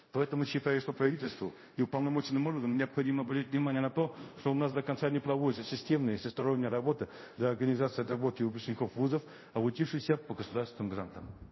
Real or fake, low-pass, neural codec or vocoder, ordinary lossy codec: fake; 7.2 kHz; codec, 24 kHz, 0.5 kbps, DualCodec; MP3, 24 kbps